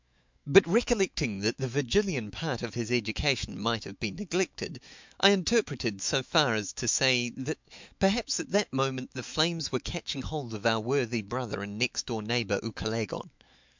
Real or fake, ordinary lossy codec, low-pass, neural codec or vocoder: fake; MP3, 64 kbps; 7.2 kHz; autoencoder, 48 kHz, 128 numbers a frame, DAC-VAE, trained on Japanese speech